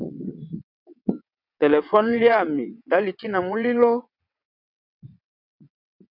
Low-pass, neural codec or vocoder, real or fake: 5.4 kHz; vocoder, 22.05 kHz, 80 mel bands, WaveNeXt; fake